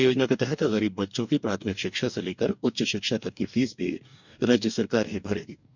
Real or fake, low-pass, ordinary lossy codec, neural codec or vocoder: fake; 7.2 kHz; none; codec, 44.1 kHz, 2.6 kbps, DAC